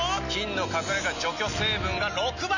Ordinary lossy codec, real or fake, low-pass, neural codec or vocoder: none; real; 7.2 kHz; none